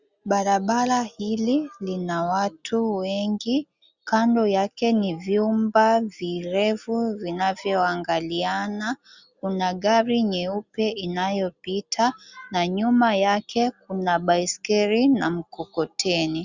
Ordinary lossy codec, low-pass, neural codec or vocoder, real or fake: Opus, 64 kbps; 7.2 kHz; none; real